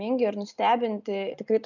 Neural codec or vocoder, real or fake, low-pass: none; real; 7.2 kHz